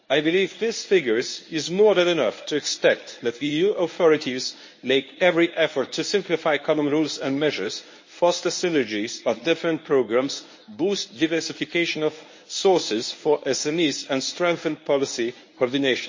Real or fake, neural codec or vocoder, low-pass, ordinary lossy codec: fake; codec, 24 kHz, 0.9 kbps, WavTokenizer, medium speech release version 1; 7.2 kHz; MP3, 32 kbps